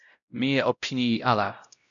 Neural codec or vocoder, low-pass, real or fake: codec, 16 kHz, 0.5 kbps, X-Codec, WavLM features, trained on Multilingual LibriSpeech; 7.2 kHz; fake